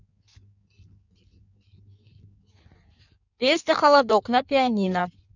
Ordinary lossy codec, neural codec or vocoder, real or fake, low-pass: none; codec, 16 kHz in and 24 kHz out, 1.1 kbps, FireRedTTS-2 codec; fake; 7.2 kHz